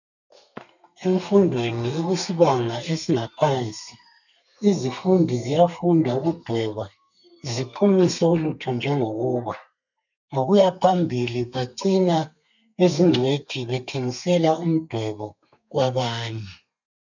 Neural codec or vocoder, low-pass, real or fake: codec, 32 kHz, 1.9 kbps, SNAC; 7.2 kHz; fake